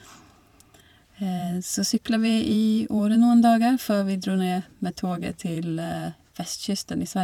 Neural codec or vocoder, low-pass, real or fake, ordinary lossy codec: vocoder, 44.1 kHz, 128 mel bands every 512 samples, BigVGAN v2; 19.8 kHz; fake; none